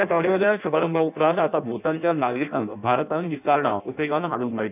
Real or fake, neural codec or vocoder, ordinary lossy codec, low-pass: fake; codec, 16 kHz in and 24 kHz out, 0.6 kbps, FireRedTTS-2 codec; none; 3.6 kHz